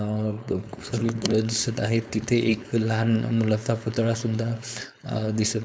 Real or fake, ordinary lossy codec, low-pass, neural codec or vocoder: fake; none; none; codec, 16 kHz, 4.8 kbps, FACodec